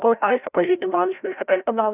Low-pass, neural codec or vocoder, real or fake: 3.6 kHz; codec, 16 kHz, 0.5 kbps, FreqCodec, larger model; fake